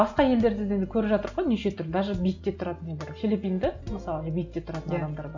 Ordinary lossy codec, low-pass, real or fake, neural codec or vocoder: none; 7.2 kHz; real; none